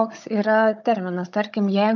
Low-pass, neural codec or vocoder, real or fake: 7.2 kHz; codec, 16 kHz, 16 kbps, FunCodec, trained on Chinese and English, 50 frames a second; fake